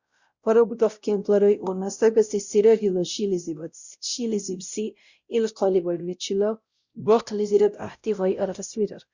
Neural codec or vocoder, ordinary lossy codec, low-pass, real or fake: codec, 16 kHz, 0.5 kbps, X-Codec, WavLM features, trained on Multilingual LibriSpeech; Opus, 64 kbps; 7.2 kHz; fake